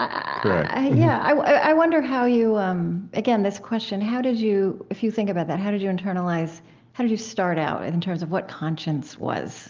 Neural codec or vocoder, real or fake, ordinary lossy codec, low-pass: none; real; Opus, 32 kbps; 7.2 kHz